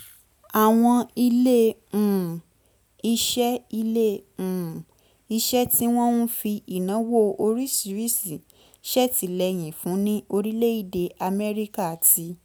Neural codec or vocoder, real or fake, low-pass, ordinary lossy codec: none; real; none; none